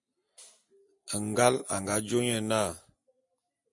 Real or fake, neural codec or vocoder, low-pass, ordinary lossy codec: real; none; 10.8 kHz; MP3, 48 kbps